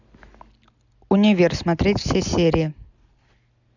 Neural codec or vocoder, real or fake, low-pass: none; real; 7.2 kHz